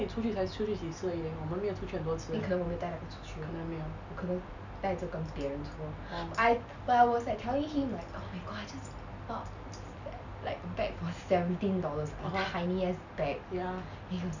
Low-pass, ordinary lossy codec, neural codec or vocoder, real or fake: 7.2 kHz; none; none; real